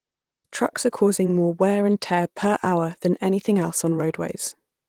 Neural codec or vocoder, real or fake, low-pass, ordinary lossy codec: vocoder, 44.1 kHz, 128 mel bands, Pupu-Vocoder; fake; 19.8 kHz; Opus, 24 kbps